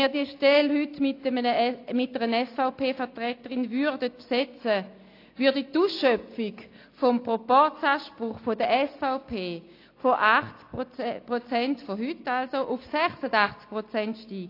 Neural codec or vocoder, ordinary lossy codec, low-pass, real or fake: none; AAC, 32 kbps; 5.4 kHz; real